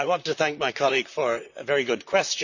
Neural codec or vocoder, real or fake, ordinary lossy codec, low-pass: vocoder, 44.1 kHz, 128 mel bands, Pupu-Vocoder; fake; none; 7.2 kHz